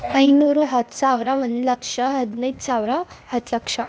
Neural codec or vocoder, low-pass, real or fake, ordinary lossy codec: codec, 16 kHz, 0.8 kbps, ZipCodec; none; fake; none